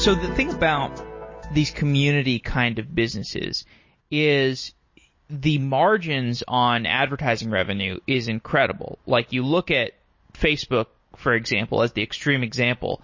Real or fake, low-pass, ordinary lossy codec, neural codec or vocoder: real; 7.2 kHz; MP3, 32 kbps; none